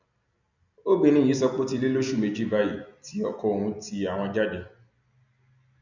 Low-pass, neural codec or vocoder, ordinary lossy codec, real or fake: 7.2 kHz; none; none; real